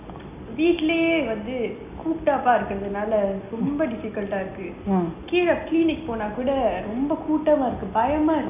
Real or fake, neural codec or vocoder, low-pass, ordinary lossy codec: real; none; 3.6 kHz; none